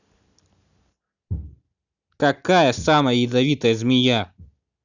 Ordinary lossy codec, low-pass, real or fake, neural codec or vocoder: none; 7.2 kHz; real; none